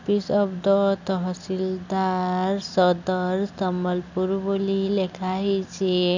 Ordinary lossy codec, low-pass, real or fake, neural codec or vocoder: none; 7.2 kHz; real; none